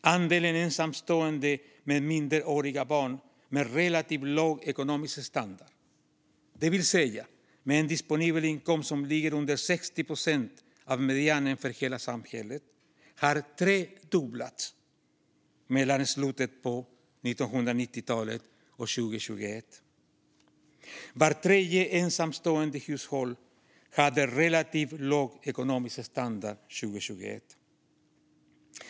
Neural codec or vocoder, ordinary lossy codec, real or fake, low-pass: none; none; real; none